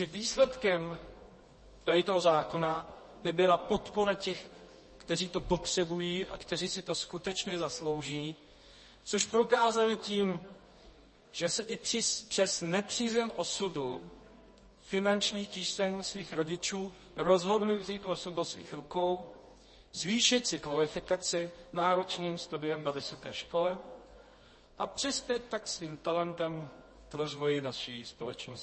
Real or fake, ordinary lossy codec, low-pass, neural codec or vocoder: fake; MP3, 32 kbps; 10.8 kHz; codec, 24 kHz, 0.9 kbps, WavTokenizer, medium music audio release